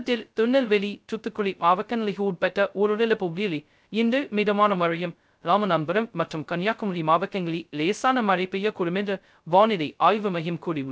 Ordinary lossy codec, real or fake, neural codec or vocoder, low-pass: none; fake; codec, 16 kHz, 0.2 kbps, FocalCodec; none